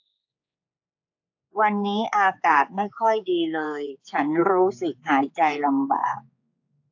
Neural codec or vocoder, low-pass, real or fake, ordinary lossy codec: codec, 16 kHz, 4 kbps, X-Codec, HuBERT features, trained on general audio; 7.2 kHz; fake; AAC, 48 kbps